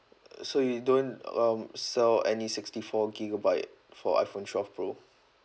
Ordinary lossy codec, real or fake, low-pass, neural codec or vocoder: none; real; none; none